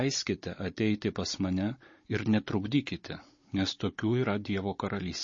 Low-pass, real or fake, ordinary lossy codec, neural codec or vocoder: 7.2 kHz; fake; MP3, 32 kbps; codec, 16 kHz, 16 kbps, FunCodec, trained on Chinese and English, 50 frames a second